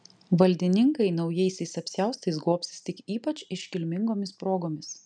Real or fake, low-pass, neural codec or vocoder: real; 9.9 kHz; none